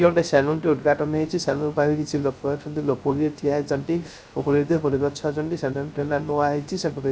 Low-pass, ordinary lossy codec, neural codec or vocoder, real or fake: none; none; codec, 16 kHz, 0.3 kbps, FocalCodec; fake